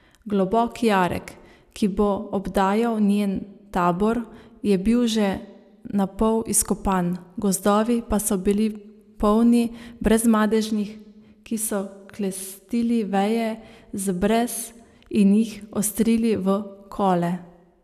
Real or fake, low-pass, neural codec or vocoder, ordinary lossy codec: real; 14.4 kHz; none; none